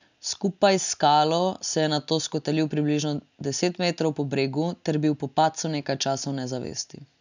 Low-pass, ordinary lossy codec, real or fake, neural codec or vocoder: 7.2 kHz; none; real; none